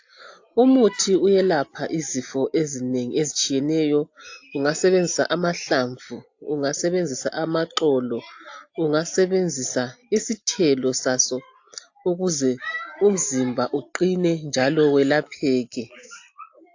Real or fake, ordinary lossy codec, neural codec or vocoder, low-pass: real; AAC, 48 kbps; none; 7.2 kHz